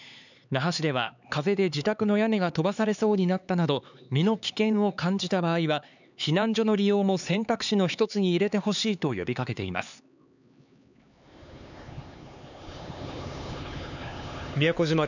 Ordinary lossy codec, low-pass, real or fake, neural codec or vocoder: none; 7.2 kHz; fake; codec, 16 kHz, 4 kbps, X-Codec, HuBERT features, trained on LibriSpeech